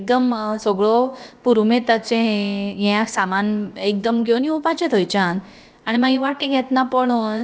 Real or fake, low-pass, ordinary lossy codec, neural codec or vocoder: fake; none; none; codec, 16 kHz, about 1 kbps, DyCAST, with the encoder's durations